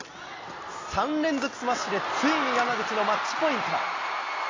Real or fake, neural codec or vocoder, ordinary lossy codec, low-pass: real; none; AAC, 32 kbps; 7.2 kHz